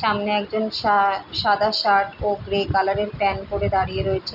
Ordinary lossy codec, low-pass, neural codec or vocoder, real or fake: none; 5.4 kHz; none; real